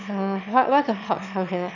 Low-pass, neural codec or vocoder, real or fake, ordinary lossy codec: 7.2 kHz; autoencoder, 22.05 kHz, a latent of 192 numbers a frame, VITS, trained on one speaker; fake; none